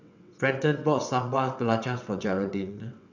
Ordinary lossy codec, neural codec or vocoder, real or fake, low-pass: none; vocoder, 22.05 kHz, 80 mel bands, WaveNeXt; fake; 7.2 kHz